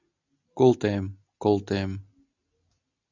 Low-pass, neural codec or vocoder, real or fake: 7.2 kHz; none; real